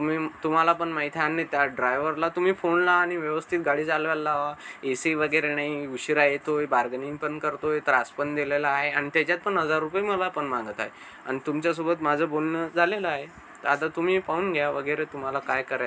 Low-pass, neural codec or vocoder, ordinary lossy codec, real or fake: none; none; none; real